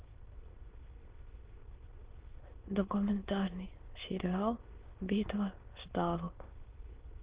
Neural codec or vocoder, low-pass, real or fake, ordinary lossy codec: autoencoder, 22.05 kHz, a latent of 192 numbers a frame, VITS, trained on many speakers; 3.6 kHz; fake; Opus, 16 kbps